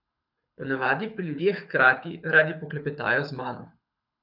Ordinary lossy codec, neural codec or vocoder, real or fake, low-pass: none; codec, 24 kHz, 6 kbps, HILCodec; fake; 5.4 kHz